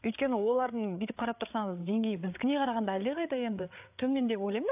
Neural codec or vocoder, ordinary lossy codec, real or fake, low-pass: codec, 16 kHz, 8 kbps, FreqCodec, larger model; AAC, 32 kbps; fake; 3.6 kHz